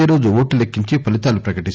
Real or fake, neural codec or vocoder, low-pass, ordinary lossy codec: real; none; none; none